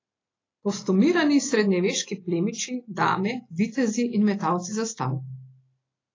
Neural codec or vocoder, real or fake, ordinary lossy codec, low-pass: none; real; AAC, 32 kbps; 7.2 kHz